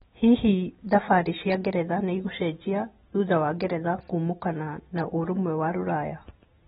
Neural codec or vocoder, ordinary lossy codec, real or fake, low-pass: none; AAC, 16 kbps; real; 19.8 kHz